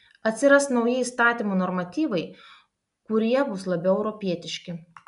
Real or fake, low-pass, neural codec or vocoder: real; 10.8 kHz; none